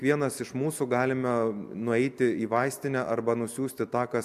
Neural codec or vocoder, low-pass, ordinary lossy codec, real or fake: none; 14.4 kHz; MP3, 96 kbps; real